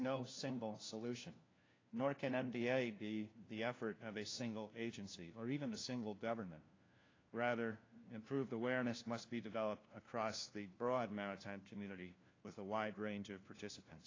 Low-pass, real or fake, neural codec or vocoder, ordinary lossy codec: 7.2 kHz; fake; codec, 16 kHz, 1 kbps, FunCodec, trained on LibriTTS, 50 frames a second; AAC, 32 kbps